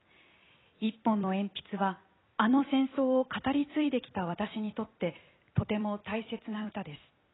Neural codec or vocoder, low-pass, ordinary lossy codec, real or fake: vocoder, 44.1 kHz, 128 mel bands every 256 samples, BigVGAN v2; 7.2 kHz; AAC, 16 kbps; fake